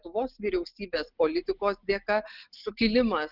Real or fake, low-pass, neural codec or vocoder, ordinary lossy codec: real; 5.4 kHz; none; Opus, 24 kbps